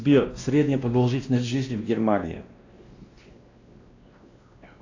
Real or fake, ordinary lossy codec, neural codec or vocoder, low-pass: fake; AAC, 48 kbps; codec, 16 kHz, 1 kbps, X-Codec, WavLM features, trained on Multilingual LibriSpeech; 7.2 kHz